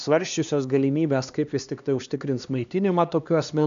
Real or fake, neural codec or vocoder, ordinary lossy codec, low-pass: fake; codec, 16 kHz, 2 kbps, X-Codec, HuBERT features, trained on LibriSpeech; AAC, 96 kbps; 7.2 kHz